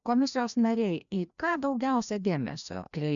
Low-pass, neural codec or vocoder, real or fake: 7.2 kHz; codec, 16 kHz, 1 kbps, FreqCodec, larger model; fake